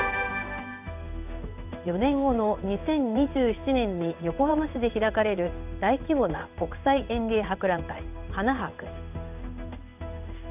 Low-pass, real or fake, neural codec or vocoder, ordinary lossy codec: 3.6 kHz; fake; codec, 16 kHz in and 24 kHz out, 1 kbps, XY-Tokenizer; none